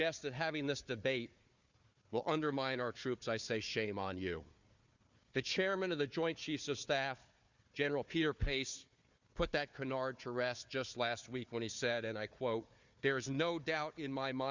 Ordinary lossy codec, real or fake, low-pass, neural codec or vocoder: Opus, 64 kbps; fake; 7.2 kHz; codec, 16 kHz, 4 kbps, FunCodec, trained on Chinese and English, 50 frames a second